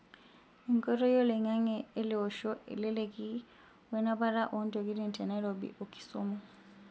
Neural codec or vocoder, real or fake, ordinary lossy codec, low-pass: none; real; none; none